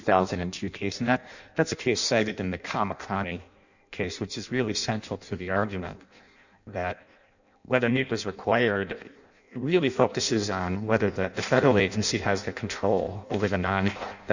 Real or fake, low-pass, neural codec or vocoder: fake; 7.2 kHz; codec, 16 kHz in and 24 kHz out, 0.6 kbps, FireRedTTS-2 codec